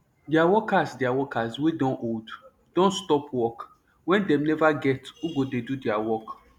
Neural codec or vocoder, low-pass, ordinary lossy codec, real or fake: none; 19.8 kHz; none; real